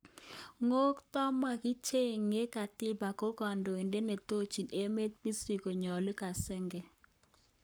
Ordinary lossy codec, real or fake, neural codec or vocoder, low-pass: none; fake; codec, 44.1 kHz, 7.8 kbps, Pupu-Codec; none